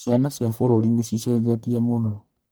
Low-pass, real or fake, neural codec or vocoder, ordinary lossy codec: none; fake; codec, 44.1 kHz, 1.7 kbps, Pupu-Codec; none